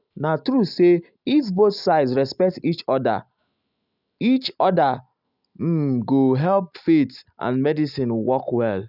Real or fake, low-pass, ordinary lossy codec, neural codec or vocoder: real; 5.4 kHz; none; none